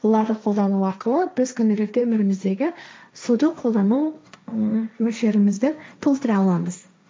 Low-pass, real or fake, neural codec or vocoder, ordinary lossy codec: none; fake; codec, 16 kHz, 1.1 kbps, Voila-Tokenizer; none